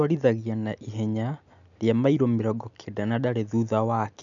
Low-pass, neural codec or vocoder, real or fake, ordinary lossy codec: 7.2 kHz; none; real; none